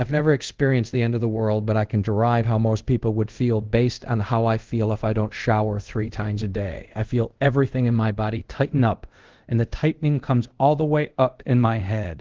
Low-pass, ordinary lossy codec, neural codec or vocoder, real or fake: 7.2 kHz; Opus, 16 kbps; codec, 24 kHz, 0.5 kbps, DualCodec; fake